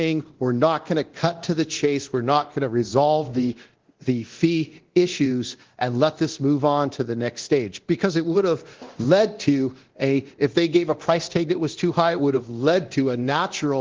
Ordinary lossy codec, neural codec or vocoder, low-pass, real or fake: Opus, 16 kbps; codec, 24 kHz, 0.9 kbps, DualCodec; 7.2 kHz; fake